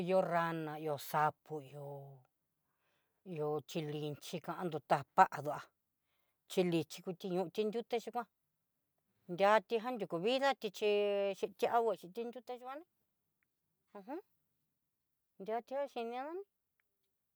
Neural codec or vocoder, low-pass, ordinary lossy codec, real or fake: none; none; none; real